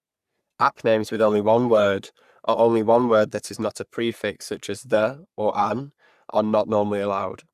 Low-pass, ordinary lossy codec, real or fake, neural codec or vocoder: 14.4 kHz; none; fake; codec, 44.1 kHz, 3.4 kbps, Pupu-Codec